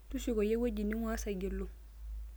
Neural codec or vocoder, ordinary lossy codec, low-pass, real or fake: none; none; none; real